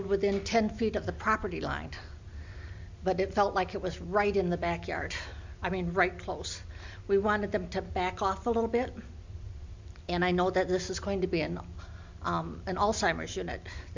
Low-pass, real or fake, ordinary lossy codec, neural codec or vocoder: 7.2 kHz; real; MP3, 64 kbps; none